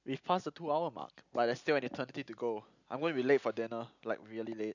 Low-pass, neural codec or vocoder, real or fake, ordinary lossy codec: 7.2 kHz; none; real; none